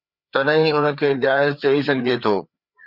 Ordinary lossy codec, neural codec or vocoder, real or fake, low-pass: Opus, 64 kbps; codec, 16 kHz, 4 kbps, FreqCodec, larger model; fake; 5.4 kHz